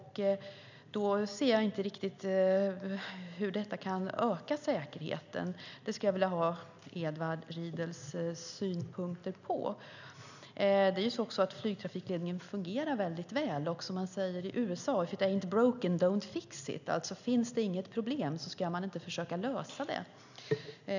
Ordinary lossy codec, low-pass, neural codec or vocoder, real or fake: none; 7.2 kHz; none; real